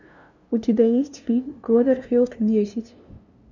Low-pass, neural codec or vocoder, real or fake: 7.2 kHz; codec, 16 kHz, 0.5 kbps, FunCodec, trained on LibriTTS, 25 frames a second; fake